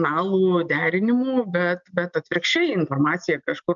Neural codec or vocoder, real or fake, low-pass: none; real; 7.2 kHz